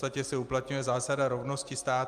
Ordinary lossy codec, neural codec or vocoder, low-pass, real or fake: Opus, 32 kbps; none; 14.4 kHz; real